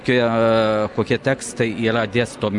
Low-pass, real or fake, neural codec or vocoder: 10.8 kHz; real; none